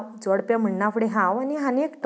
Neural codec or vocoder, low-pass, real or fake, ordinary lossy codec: none; none; real; none